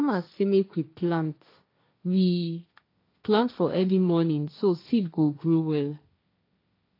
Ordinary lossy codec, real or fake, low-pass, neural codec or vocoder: AAC, 32 kbps; fake; 5.4 kHz; codec, 16 kHz, 1.1 kbps, Voila-Tokenizer